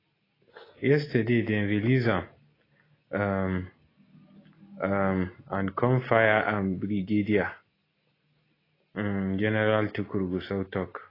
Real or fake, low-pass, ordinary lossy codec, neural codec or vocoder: real; 5.4 kHz; AAC, 24 kbps; none